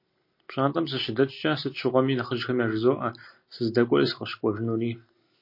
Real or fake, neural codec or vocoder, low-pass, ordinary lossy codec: real; none; 5.4 kHz; MP3, 32 kbps